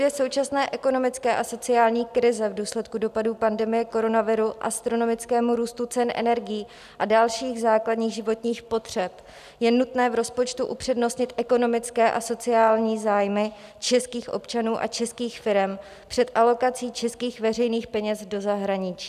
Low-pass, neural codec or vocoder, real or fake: 14.4 kHz; none; real